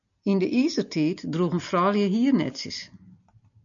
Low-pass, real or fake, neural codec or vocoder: 7.2 kHz; real; none